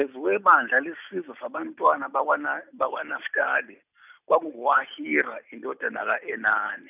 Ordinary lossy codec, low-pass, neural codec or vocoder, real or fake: none; 3.6 kHz; none; real